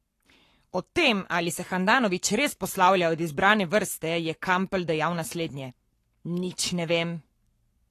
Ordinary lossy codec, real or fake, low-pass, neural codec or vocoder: AAC, 48 kbps; fake; 14.4 kHz; codec, 44.1 kHz, 7.8 kbps, Pupu-Codec